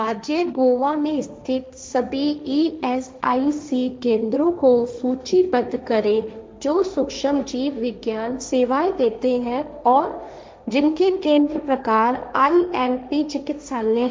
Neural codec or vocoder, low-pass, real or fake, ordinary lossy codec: codec, 16 kHz, 1.1 kbps, Voila-Tokenizer; none; fake; none